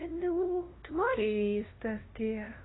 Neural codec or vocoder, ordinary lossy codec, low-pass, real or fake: codec, 16 kHz, 0.5 kbps, FunCodec, trained on LibriTTS, 25 frames a second; AAC, 16 kbps; 7.2 kHz; fake